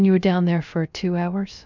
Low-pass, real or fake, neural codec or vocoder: 7.2 kHz; fake; codec, 16 kHz, 0.3 kbps, FocalCodec